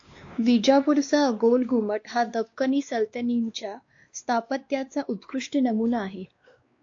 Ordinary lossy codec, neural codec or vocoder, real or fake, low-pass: AAC, 48 kbps; codec, 16 kHz, 2 kbps, X-Codec, WavLM features, trained on Multilingual LibriSpeech; fake; 7.2 kHz